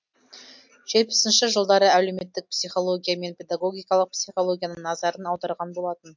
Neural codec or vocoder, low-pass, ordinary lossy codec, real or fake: none; 7.2 kHz; MP3, 48 kbps; real